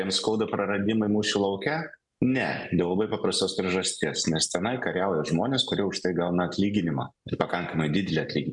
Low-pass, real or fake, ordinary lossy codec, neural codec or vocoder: 10.8 kHz; real; MP3, 96 kbps; none